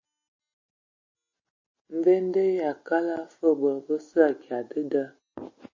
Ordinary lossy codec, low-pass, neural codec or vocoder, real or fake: MP3, 32 kbps; 7.2 kHz; none; real